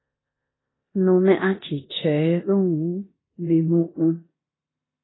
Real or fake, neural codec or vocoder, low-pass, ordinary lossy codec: fake; codec, 16 kHz in and 24 kHz out, 0.9 kbps, LongCat-Audio-Codec, four codebook decoder; 7.2 kHz; AAC, 16 kbps